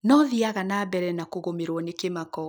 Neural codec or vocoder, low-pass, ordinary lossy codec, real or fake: none; none; none; real